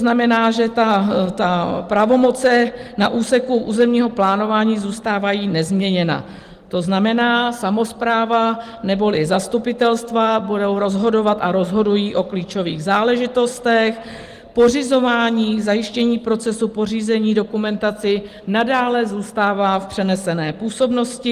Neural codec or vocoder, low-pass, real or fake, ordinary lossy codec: none; 14.4 kHz; real; Opus, 24 kbps